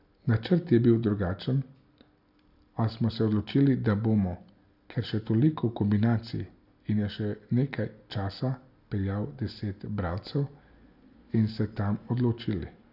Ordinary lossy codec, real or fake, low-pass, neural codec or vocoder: none; real; 5.4 kHz; none